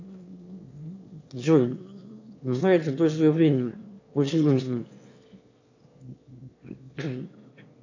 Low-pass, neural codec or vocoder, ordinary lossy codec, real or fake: 7.2 kHz; autoencoder, 22.05 kHz, a latent of 192 numbers a frame, VITS, trained on one speaker; AAC, 48 kbps; fake